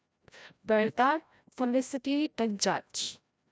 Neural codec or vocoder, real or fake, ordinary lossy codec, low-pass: codec, 16 kHz, 0.5 kbps, FreqCodec, larger model; fake; none; none